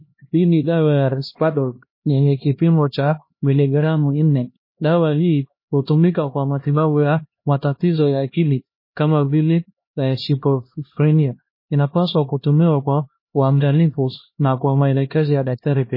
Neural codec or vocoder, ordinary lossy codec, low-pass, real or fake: codec, 16 kHz, 1 kbps, X-Codec, HuBERT features, trained on LibriSpeech; MP3, 24 kbps; 5.4 kHz; fake